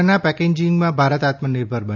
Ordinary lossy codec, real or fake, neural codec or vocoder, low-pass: none; real; none; 7.2 kHz